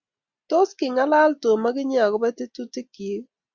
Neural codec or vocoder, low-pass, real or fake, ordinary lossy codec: none; 7.2 kHz; real; Opus, 64 kbps